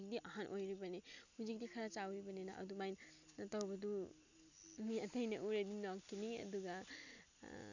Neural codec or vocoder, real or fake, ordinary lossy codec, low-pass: none; real; none; 7.2 kHz